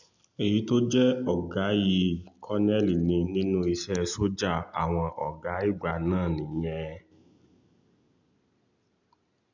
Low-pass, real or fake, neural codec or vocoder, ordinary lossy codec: 7.2 kHz; real; none; none